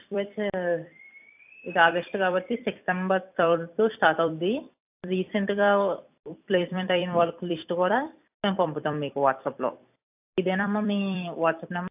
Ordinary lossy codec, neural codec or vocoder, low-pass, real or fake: AAC, 32 kbps; vocoder, 44.1 kHz, 128 mel bands every 256 samples, BigVGAN v2; 3.6 kHz; fake